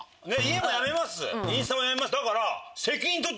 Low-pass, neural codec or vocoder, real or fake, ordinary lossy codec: none; none; real; none